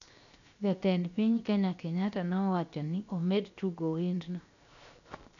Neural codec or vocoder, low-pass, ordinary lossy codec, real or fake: codec, 16 kHz, 0.7 kbps, FocalCodec; 7.2 kHz; none; fake